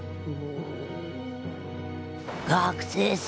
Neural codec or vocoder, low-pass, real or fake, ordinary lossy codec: none; none; real; none